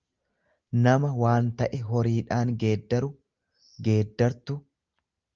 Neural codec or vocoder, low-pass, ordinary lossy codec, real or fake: none; 7.2 kHz; Opus, 24 kbps; real